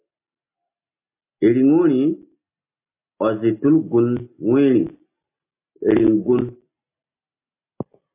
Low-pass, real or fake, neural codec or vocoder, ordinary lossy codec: 3.6 kHz; real; none; MP3, 24 kbps